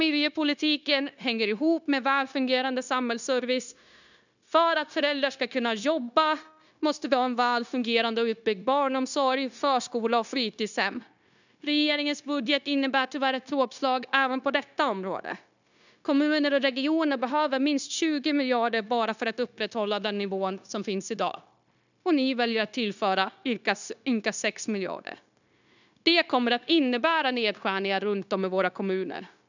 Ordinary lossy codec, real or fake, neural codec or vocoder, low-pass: none; fake; codec, 16 kHz, 0.9 kbps, LongCat-Audio-Codec; 7.2 kHz